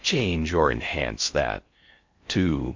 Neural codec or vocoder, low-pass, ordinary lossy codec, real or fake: codec, 16 kHz in and 24 kHz out, 0.6 kbps, FocalCodec, streaming, 4096 codes; 7.2 kHz; MP3, 48 kbps; fake